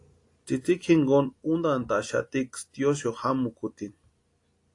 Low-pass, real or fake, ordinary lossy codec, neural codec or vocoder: 10.8 kHz; real; AAC, 48 kbps; none